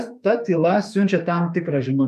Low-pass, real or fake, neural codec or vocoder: 14.4 kHz; fake; autoencoder, 48 kHz, 32 numbers a frame, DAC-VAE, trained on Japanese speech